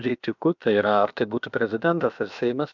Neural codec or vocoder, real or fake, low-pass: codec, 16 kHz, about 1 kbps, DyCAST, with the encoder's durations; fake; 7.2 kHz